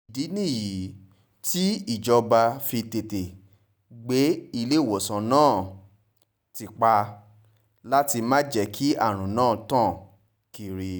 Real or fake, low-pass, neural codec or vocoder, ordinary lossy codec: real; none; none; none